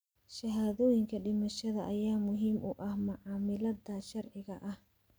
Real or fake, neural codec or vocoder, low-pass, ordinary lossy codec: real; none; none; none